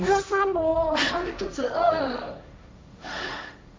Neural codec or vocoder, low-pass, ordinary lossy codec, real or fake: codec, 16 kHz, 1.1 kbps, Voila-Tokenizer; none; none; fake